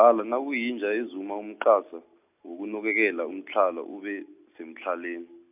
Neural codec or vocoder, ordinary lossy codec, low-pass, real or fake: none; none; 3.6 kHz; real